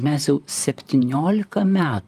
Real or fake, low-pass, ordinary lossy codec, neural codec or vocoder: fake; 14.4 kHz; Opus, 32 kbps; vocoder, 44.1 kHz, 128 mel bands, Pupu-Vocoder